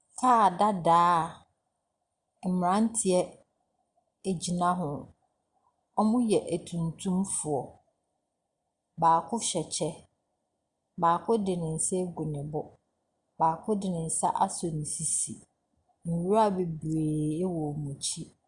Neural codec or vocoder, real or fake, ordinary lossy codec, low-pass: none; real; Opus, 64 kbps; 10.8 kHz